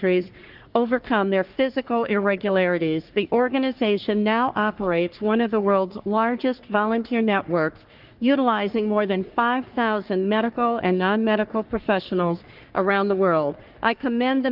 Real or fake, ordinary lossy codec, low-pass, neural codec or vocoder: fake; Opus, 32 kbps; 5.4 kHz; codec, 44.1 kHz, 3.4 kbps, Pupu-Codec